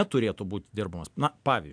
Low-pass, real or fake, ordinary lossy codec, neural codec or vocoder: 9.9 kHz; real; AAC, 64 kbps; none